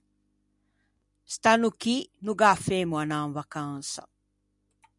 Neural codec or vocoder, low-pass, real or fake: none; 10.8 kHz; real